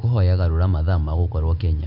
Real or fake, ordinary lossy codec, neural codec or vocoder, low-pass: real; MP3, 48 kbps; none; 5.4 kHz